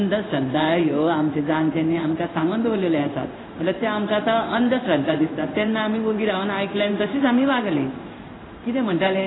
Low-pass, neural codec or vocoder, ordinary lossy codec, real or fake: 7.2 kHz; codec, 16 kHz in and 24 kHz out, 1 kbps, XY-Tokenizer; AAC, 16 kbps; fake